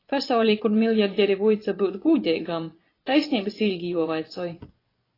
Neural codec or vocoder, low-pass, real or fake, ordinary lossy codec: vocoder, 24 kHz, 100 mel bands, Vocos; 5.4 kHz; fake; AAC, 24 kbps